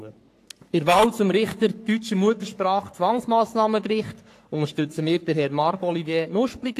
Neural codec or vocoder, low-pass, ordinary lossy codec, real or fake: codec, 44.1 kHz, 3.4 kbps, Pupu-Codec; 14.4 kHz; AAC, 64 kbps; fake